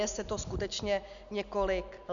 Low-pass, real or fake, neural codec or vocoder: 7.2 kHz; real; none